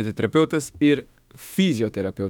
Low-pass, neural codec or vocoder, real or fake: 19.8 kHz; autoencoder, 48 kHz, 32 numbers a frame, DAC-VAE, trained on Japanese speech; fake